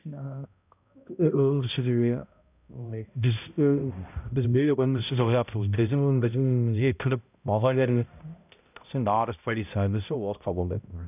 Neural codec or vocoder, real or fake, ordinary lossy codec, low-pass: codec, 16 kHz, 0.5 kbps, X-Codec, HuBERT features, trained on balanced general audio; fake; none; 3.6 kHz